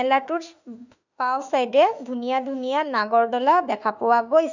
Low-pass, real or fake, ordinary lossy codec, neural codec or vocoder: 7.2 kHz; fake; none; autoencoder, 48 kHz, 32 numbers a frame, DAC-VAE, trained on Japanese speech